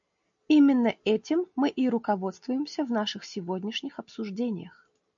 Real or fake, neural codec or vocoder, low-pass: real; none; 7.2 kHz